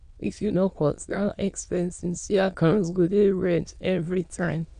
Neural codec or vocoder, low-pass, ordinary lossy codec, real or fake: autoencoder, 22.05 kHz, a latent of 192 numbers a frame, VITS, trained on many speakers; 9.9 kHz; none; fake